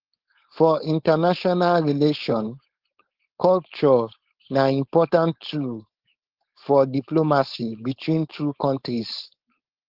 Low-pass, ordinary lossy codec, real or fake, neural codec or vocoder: 5.4 kHz; Opus, 16 kbps; fake; codec, 16 kHz, 4.8 kbps, FACodec